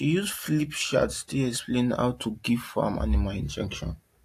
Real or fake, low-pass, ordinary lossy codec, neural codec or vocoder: real; 14.4 kHz; AAC, 64 kbps; none